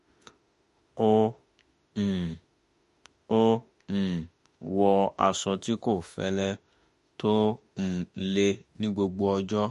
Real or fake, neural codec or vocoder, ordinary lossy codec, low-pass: fake; autoencoder, 48 kHz, 32 numbers a frame, DAC-VAE, trained on Japanese speech; MP3, 48 kbps; 14.4 kHz